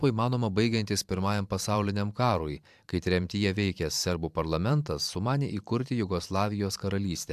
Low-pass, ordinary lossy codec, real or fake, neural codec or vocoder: 14.4 kHz; AAC, 96 kbps; fake; vocoder, 44.1 kHz, 128 mel bands every 512 samples, BigVGAN v2